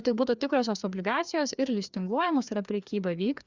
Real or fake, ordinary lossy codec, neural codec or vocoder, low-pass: fake; Opus, 64 kbps; codec, 16 kHz, 2 kbps, FreqCodec, larger model; 7.2 kHz